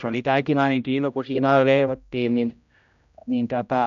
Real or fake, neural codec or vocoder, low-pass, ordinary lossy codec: fake; codec, 16 kHz, 0.5 kbps, X-Codec, HuBERT features, trained on general audio; 7.2 kHz; none